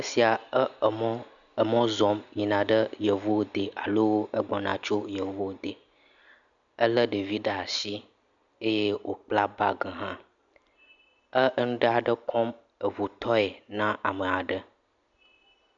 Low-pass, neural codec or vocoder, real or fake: 7.2 kHz; none; real